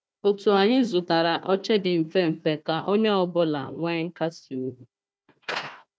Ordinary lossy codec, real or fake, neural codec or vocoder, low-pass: none; fake; codec, 16 kHz, 1 kbps, FunCodec, trained on Chinese and English, 50 frames a second; none